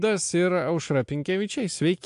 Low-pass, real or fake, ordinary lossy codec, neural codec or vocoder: 10.8 kHz; real; AAC, 64 kbps; none